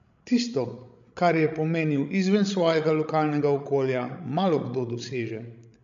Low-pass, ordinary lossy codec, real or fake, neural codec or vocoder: 7.2 kHz; MP3, 64 kbps; fake; codec, 16 kHz, 16 kbps, FreqCodec, larger model